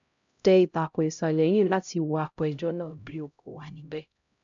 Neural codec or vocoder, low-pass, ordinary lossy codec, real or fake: codec, 16 kHz, 0.5 kbps, X-Codec, HuBERT features, trained on LibriSpeech; 7.2 kHz; AAC, 64 kbps; fake